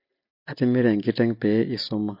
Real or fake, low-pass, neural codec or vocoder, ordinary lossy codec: real; 5.4 kHz; none; MP3, 32 kbps